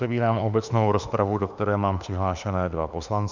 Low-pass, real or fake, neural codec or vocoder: 7.2 kHz; fake; autoencoder, 48 kHz, 32 numbers a frame, DAC-VAE, trained on Japanese speech